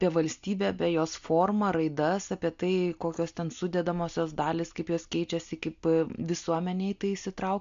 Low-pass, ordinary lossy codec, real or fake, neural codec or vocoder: 7.2 kHz; MP3, 64 kbps; real; none